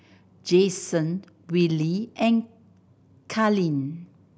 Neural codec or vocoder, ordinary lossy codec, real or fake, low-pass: none; none; real; none